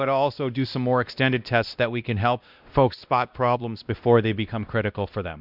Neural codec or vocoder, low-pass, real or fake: codec, 16 kHz, 1 kbps, X-Codec, WavLM features, trained on Multilingual LibriSpeech; 5.4 kHz; fake